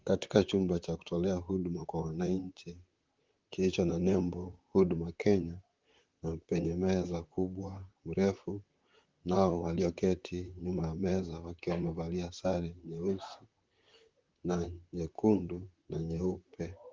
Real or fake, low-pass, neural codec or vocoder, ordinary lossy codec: fake; 7.2 kHz; vocoder, 44.1 kHz, 128 mel bands, Pupu-Vocoder; Opus, 32 kbps